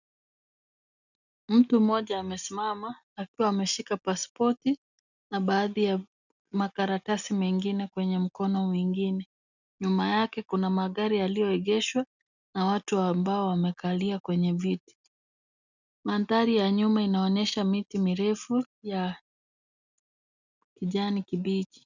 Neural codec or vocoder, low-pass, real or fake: none; 7.2 kHz; real